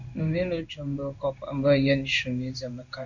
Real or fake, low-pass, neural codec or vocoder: fake; 7.2 kHz; codec, 16 kHz in and 24 kHz out, 1 kbps, XY-Tokenizer